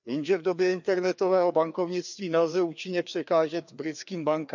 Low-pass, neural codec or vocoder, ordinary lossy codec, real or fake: 7.2 kHz; codec, 16 kHz, 2 kbps, FreqCodec, larger model; none; fake